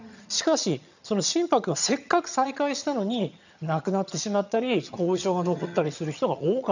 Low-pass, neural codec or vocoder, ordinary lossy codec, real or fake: 7.2 kHz; vocoder, 22.05 kHz, 80 mel bands, HiFi-GAN; none; fake